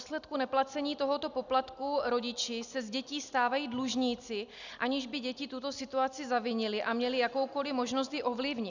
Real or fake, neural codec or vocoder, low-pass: real; none; 7.2 kHz